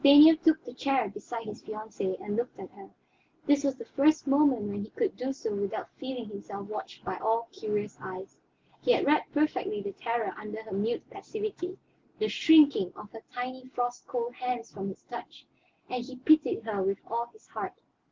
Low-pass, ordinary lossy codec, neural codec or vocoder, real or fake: 7.2 kHz; Opus, 16 kbps; none; real